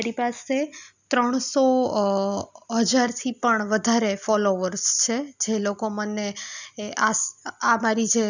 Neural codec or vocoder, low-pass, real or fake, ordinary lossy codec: none; 7.2 kHz; real; none